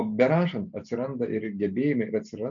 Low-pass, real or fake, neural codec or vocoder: 7.2 kHz; real; none